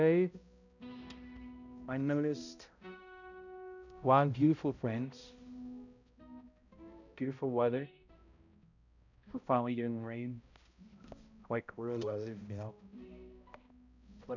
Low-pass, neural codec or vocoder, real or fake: 7.2 kHz; codec, 16 kHz, 0.5 kbps, X-Codec, HuBERT features, trained on balanced general audio; fake